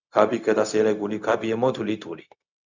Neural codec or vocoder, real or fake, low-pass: codec, 16 kHz, 0.4 kbps, LongCat-Audio-Codec; fake; 7.2 kHz